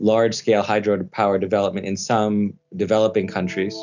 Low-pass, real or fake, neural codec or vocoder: 7.2 kHz; real; none